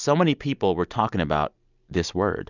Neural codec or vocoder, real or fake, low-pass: none; real; 7.2 kHz